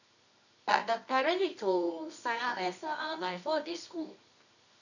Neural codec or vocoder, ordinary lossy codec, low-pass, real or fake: codec, 24 kHz, 0.9 kbps, WavTokenizer, medium music audio release; none; 7.2 kHz; fake